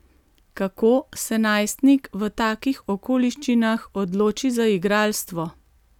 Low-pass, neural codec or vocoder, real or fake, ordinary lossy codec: 19.8 kHz; none; real; none